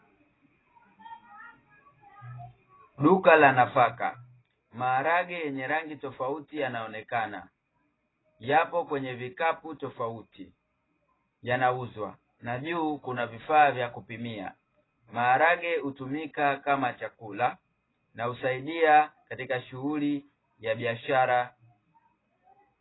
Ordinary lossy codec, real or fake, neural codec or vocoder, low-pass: AAC, 16 kbps; real; none; 7.2 kHz